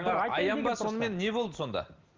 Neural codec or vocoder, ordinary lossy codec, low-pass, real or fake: none; Opus, 24 kbps; 7.2 kHz; real